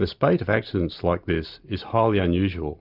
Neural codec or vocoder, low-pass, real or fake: none; 5.4 kHz; real